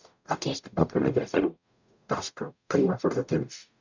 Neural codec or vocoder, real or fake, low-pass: codec, 44.1 kHz, 0.9 kbps, DAC; fake; 7.2 kHz